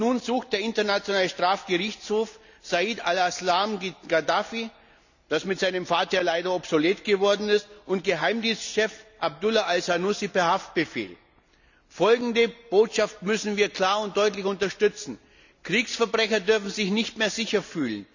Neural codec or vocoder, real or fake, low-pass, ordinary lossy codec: none; real; 7.2 kHz; none